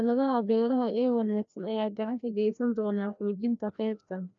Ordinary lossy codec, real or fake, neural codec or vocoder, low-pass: none; fake; codec, 16 kHz, 1 kbps, FreqCodec, larger model; 7.2 kHz